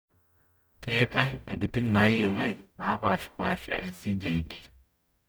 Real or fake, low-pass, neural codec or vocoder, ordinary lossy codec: fake; none; codec, 44.1 kHz, 0.9 kbps, DAC; none